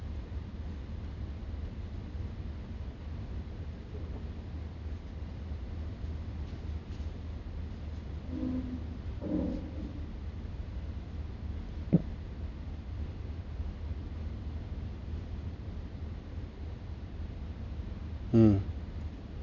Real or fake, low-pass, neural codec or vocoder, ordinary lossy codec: real; 7.2 kHz; none; none